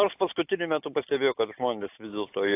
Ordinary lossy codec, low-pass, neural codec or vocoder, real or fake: AAC, 24 kbps; 3.6 kHz; none; real